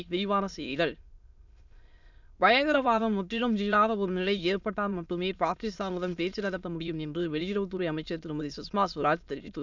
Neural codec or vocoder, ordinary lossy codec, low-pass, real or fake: autoencoder, 22.05 kHz, a latent of 192 numbers a frame, VITS, trained on many speakers; none; 7.2 kHz; fake